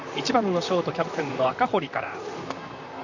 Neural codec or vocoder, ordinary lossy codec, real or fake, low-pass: vocoder, 44.1 kHz, 128 mel bands, Pupu-Vocoder; none; fake; 7.2 kHz